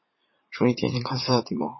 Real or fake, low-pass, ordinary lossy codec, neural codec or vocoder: fake; 7.2 kHz; MP3, 24 kbps; vocoder, 44.1 kHz, 80 mel bands, Vocos